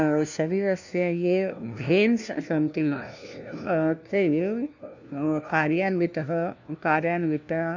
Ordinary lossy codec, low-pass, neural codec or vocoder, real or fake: none; 7.2 kHz; codec, 16 kHz, 1 kbps, FunCodec, trained on LibriTTS, 50 frames a second; fake